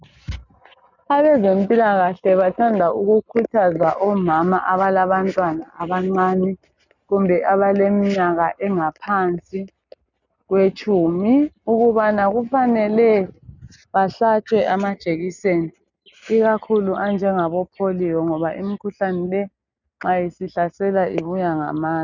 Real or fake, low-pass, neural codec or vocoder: real; 7.2 kHz; none